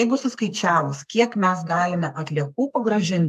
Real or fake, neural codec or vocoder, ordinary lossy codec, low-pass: fake; codec, 32 kHz, 1.9 kbps, SNAC; AAC, 96 kbps; 14.4 kHz